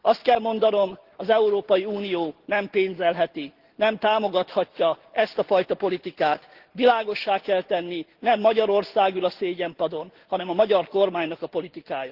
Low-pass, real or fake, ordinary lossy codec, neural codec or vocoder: 5.4 kHz; real; Opus, 16 kbps; none